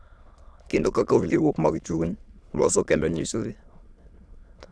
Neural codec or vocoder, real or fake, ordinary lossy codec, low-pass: autoencoder, 22.05 kHz, a latent of 192 numbers a frame, VITS, trained on many speakers; fake; none; none